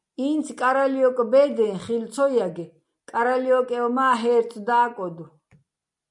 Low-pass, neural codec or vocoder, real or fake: 10.8 kHz; none; real